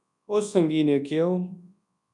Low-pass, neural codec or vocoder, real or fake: 10.8 kHz; codec, 24 kHz, 0.9 kbps, WavTokenizer, large speech release; fake